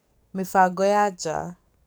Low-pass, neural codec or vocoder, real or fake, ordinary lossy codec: none; codec, 44.1 kHz, 7.8 kbps, DAC; fake; none